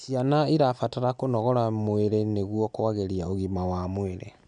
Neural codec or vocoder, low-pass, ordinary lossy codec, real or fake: none; 9.9 kHz; none; real